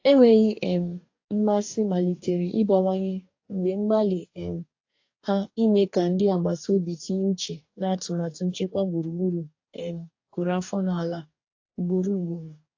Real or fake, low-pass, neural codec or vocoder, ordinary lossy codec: fake; 7.2 kHz; codec, 44.1 kHz, 2.6 kbps, DAC; AAC, 48 kbps